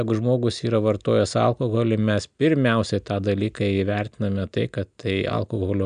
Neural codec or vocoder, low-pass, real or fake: none; 9.9 kHz; real